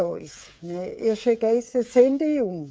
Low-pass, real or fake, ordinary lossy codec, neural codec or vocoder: none; fake; none; codec, 16 kHz, 8 kbps, FreqCodec, smaller model